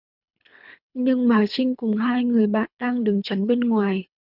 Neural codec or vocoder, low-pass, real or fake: codec, 24 kHz, 3 kbps, HILCodec; 5.4 kHz; fake